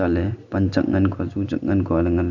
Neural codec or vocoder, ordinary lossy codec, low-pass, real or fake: none; none; 7.2 kHz; real